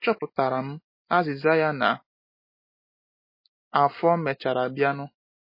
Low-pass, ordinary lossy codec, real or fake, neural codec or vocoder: 5.4 kHz; MP3, 24 kbps; real; none